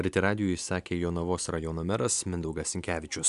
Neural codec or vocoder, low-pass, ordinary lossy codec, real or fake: none; 10.8 kHz; MP3, 96 kbps; real